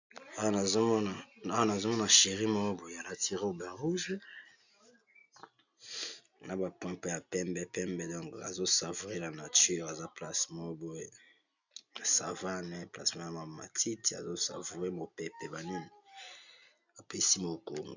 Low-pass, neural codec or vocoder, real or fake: 7.2 kHz; none; real